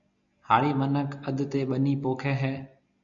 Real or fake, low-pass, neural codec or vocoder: real; 7.2 kHz; none